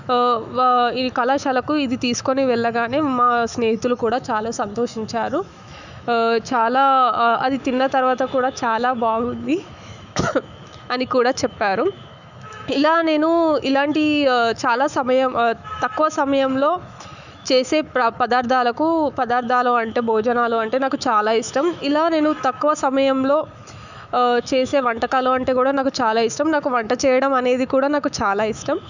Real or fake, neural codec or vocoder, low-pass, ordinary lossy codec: fake; autoencoder, 48 kHz, 128 numbers a frame, DAC-VAE, trained on Japanese speech; 7.2 kHz; none